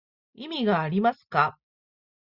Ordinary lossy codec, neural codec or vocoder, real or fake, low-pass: Opus, 64 kbps; none; real; 5.4 kHz